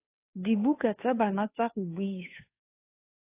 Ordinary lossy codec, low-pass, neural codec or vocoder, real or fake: AAC, 24 kbps; 3.6 kHz; codec, 16 kHz, 2 kbps, FunCodec, trained on Chinese and English, 25 frames a second; fake